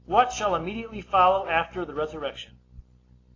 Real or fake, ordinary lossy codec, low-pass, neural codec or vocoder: real; AAC, 32 kbps; 7.2 kHz; none